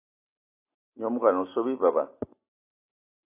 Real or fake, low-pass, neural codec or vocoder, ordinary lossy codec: real; 3.6 kHz; none; AAC, 24 kbps